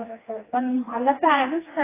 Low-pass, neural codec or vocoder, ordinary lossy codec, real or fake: 3.6 kHz; codec, 16 kHz, 2 kbps, FreqCodec, smaller model; AAC, 16 kbps; fake